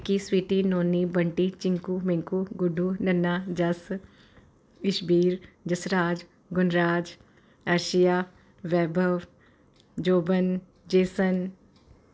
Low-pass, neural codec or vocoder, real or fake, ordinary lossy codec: none; none; real; none